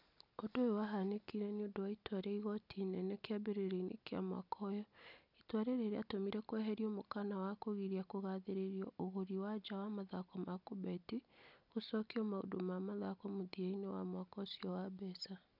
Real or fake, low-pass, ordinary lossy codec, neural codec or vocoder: real; 5.4 kHz; none; none